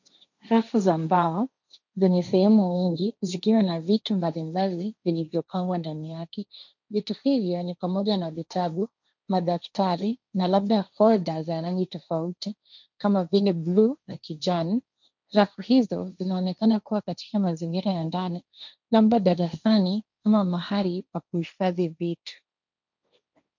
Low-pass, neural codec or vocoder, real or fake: 7.2 kHz; codec, 16 kHz, 1.1 kbps, Voila-Tokenizer; fake